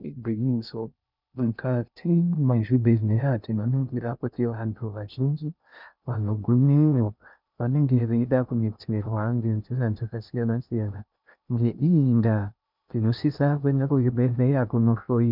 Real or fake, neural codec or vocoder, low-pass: fake; codec, 16 kHz in and 24 kHz out, 0.6 kbps, FocalCodec, streaming, 2048 codes; 5.4 kHz